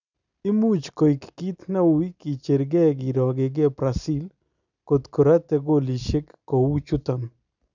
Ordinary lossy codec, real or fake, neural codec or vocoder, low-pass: none; real; none; 7.2 kHz